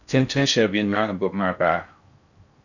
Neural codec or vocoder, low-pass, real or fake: codec, 16 kHz in and 24 kHz out, 0.6 kbps, FocalCodec, streaming, 4096 codes; 7.2 kHz; fake